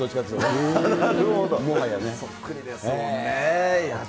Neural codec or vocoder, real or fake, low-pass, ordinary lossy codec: none; real; none; none